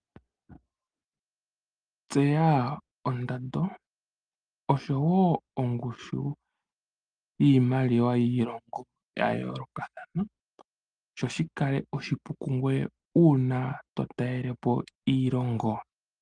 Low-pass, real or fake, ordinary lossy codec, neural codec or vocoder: 9.9 kHz; real; Opus, 32 kbps; none